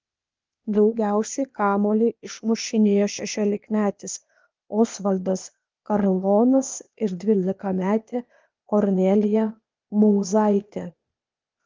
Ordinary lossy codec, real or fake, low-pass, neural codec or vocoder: Opus, 24 kbps; fake; 7.2 kHz; codec, 16 kHz, 0.8 kbps, ZipCodec